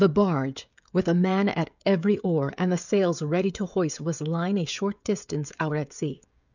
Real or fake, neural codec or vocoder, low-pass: fake; codec, 16 kHz, 16 kbps, FreqCodec, smaller model; 7.2 kHz